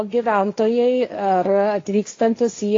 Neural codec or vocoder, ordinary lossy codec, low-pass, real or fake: codec, 16 kHz, 1.1 kbps, Voila-Tokenizer; AAC, 32 kbps; 7.2 kHz; fake